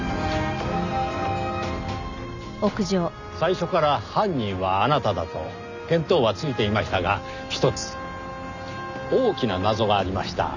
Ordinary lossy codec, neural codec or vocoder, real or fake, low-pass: none; none; real; 7.2 kHz